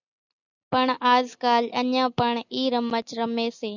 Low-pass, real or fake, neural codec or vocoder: 7.2 kHz; real; none